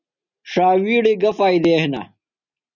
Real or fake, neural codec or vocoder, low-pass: real; none; 7.2 kHz